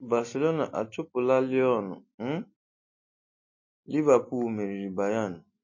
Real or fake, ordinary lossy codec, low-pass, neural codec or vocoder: real; MP3, 32 kbps; 7.2 kHz; none